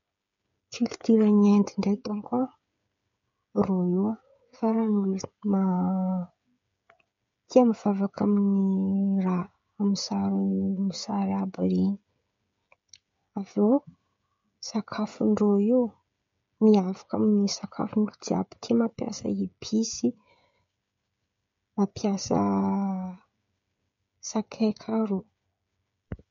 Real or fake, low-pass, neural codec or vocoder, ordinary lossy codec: fake; 7.2 kHz; codec, 16 kHz, 16 kbps, FreqCodec, smaller model; MP3, 48 kbps